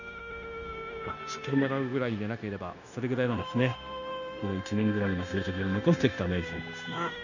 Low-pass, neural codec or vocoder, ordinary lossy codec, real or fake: 7.2 kHz; codec, 16 kHz, 0.9 kbps, LongCat-Audio-Codec; none; fake